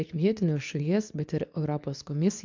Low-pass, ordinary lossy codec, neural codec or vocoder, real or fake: 7.2 kHz; MP3, 64 kbps; codec, 24 kHz, 0.9 kbps, WavTokenizer, medium speech release version 2; fake